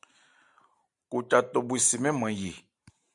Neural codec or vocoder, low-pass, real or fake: vocoder, 44.1 kHz, 128 mel bands every 512 samples, BigVGAN v2; 10.8 kHz; fake